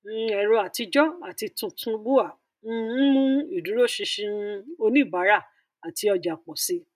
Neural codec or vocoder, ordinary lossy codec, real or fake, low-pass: none; none; real; 14.4 kHz